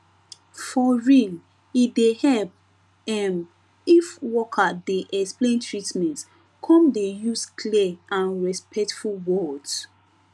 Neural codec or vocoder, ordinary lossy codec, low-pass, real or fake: none; none; none; real